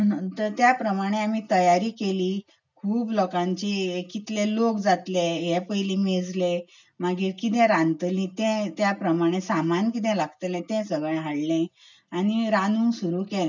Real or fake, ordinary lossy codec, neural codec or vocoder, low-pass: real; none; none; 7.2 kHz